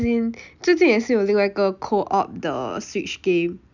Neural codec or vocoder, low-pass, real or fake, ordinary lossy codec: none; 7.2 kHz; real; none